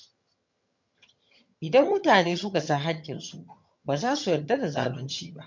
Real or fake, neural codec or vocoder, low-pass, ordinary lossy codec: fake; vocoder, 22.05 kHz, 80 mel bands, HiFi-GAN; 7.2 kHz; MP3, 48 kbps